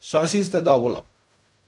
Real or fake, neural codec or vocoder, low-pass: fake; codec, 16 kHz in and 24 kHz out, 0.4 kbps, LongCat-Audio-Codec, fine tuned four codebook decoder; 10.8 kHz